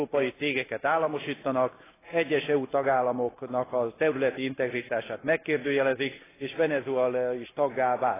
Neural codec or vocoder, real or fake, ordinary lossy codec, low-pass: none; real; AAC, 16 kbps; 3.6 kHz